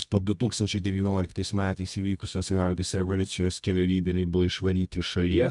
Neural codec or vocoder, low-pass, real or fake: codec, 24 kHz, 0.9 kbps, WavTokenizer, medium music audio release; 10.8 kHz; fake